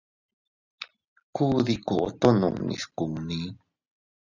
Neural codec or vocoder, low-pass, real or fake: none; 7.2 kHz; real